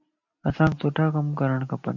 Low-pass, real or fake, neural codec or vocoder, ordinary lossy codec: 7.2 kHz; real; none; MP3, 48 kbps